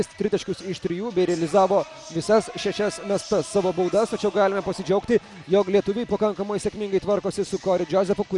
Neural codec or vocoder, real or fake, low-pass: none; real; 10.8 kHz